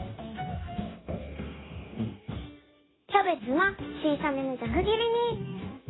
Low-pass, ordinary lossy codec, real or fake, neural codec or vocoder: 7.2 kHz; AAC, 16 kbps; fake; codec, 16 kHz, 0.9 kbps, LongCat-Audio-Codec